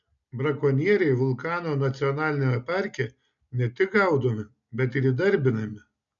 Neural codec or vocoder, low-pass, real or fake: none; 7.2 kHz; real